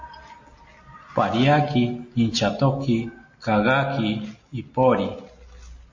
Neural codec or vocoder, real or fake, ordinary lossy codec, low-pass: none; real; MP3, 32 kbps; 7.2 kHz